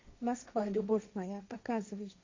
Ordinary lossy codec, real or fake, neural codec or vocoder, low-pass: MP3, 64 kbps; fake; codec, 16 kHz, 1.1 kbps, Voila-Tokenizer; 7.2 kHz